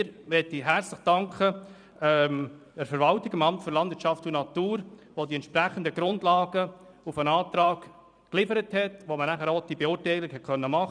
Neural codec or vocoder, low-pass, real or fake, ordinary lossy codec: vocoder, 44.1 kHz, 128 mel bands every 512 samples, BigVGAN v2; 9.9 kHz; fake; none